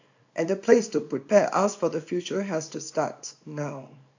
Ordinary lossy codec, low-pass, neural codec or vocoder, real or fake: AAC, 48 kbps; 7.2 kHz; codec, 24 kHz, 0.9 kbps, WavTokenizer, small release; fake